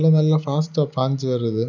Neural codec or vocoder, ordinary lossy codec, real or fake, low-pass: none; none; real; 7.2 kHz